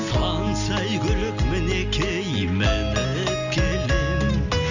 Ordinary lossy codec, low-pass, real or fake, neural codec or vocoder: none; 7.2 kHz; real; none